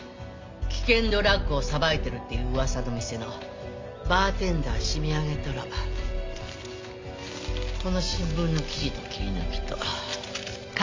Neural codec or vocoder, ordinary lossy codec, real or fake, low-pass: none; MP3, 48 kbps; real; 7.2 kHz